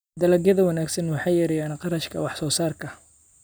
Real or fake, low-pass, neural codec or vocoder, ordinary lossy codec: real; none; none; none